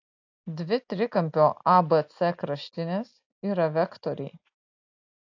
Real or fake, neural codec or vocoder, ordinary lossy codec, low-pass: real; none; AAC, 48 kbps; 7.2 kHz